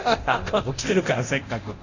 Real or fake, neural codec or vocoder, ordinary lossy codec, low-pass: fake; codec, 24 kHz, 0.9 kbps, DualCodec; none; 7.2 kHz